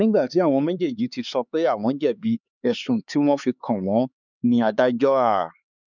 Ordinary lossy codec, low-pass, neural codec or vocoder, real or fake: none; 7.2 kHz; codec, 16 kHz, 4 kbps, X-Codec, HuBERT features, trained on LibriSpeech; fake